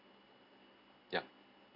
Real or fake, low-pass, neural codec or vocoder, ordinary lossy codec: real; 5.4 kHz; none; none